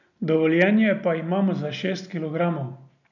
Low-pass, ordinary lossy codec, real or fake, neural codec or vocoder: 7.2 kHz; none; real; none